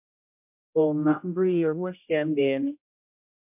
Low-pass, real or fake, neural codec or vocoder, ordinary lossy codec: 3.6 kHz; fake; codec, 16 kHz, 0.5 kbps, X-Codec, HuBERT features, trained on general audio; MP3, 32 kbps